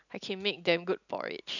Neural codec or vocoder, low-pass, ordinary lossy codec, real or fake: none; 7.2 kHz; none; real